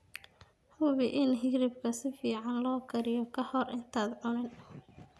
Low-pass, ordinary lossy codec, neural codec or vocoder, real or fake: none; none; none; real